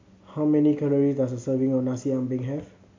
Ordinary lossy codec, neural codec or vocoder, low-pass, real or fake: MP3, 48 kbps; none; 7.2 kHz; real